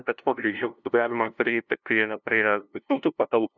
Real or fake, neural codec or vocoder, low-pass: fake; codec, 16 kHz, 0.5 kbps, FunCodec, trained on LibriTTS, 25 frames a second; 7.2 kHz